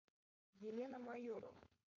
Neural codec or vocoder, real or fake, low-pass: codec, 16 kHz, 4.8 kbps, FACodec; fake; 7.2 kHz